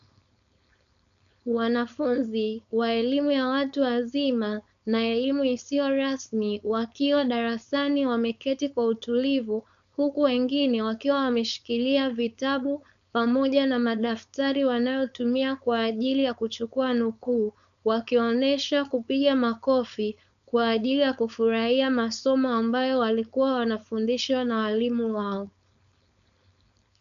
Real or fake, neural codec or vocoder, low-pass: fake; codec, 16 kHz, 4.8 kbps, FACodec; 7.2 kHz